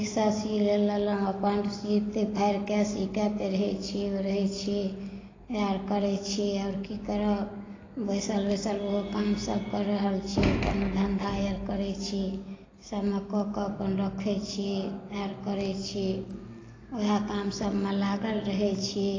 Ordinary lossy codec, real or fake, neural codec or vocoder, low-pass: AAC, 32 kbps; real; none; 7.2 kHz